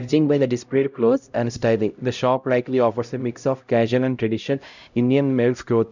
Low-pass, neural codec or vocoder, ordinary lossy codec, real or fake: 7.2 kHz; codec, 16 kHz, 0.5 kbps, X-Codec, HuBERT features, trained on LibriSpeech; none; fake